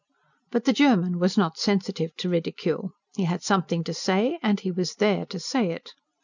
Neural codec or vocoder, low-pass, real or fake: none; 7.2 kHz; real